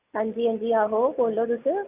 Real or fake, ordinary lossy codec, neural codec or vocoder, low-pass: real; none; none; 3.6 kHz